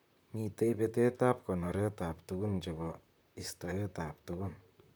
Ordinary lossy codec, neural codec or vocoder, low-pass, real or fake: none; vocoder, 44.1 kHz, 128 mel bands, Pupu-Vocoder; none; fake